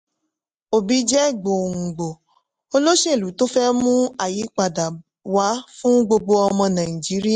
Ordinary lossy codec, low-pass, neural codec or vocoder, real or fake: MP3, 64 kbps; 10.8 kHz; none; real